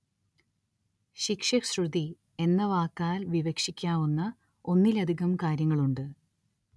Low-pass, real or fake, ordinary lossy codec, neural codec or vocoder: none; real; none; none